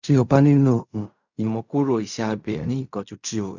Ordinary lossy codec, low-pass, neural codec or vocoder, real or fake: none; 7.2 kHz; codec, 16 kHz in and 24 kHz out, 0.4 kbps, LongCat-Audio-Codec, fine tuned four codebook decoder; fake